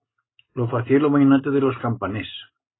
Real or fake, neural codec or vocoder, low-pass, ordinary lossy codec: real; none; 7.2 kHz; AAC, 16 kbps